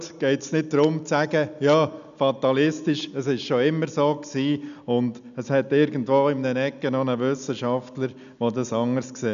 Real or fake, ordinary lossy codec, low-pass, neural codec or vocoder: real; MP3, 96 kbps; 7.2 kHz; none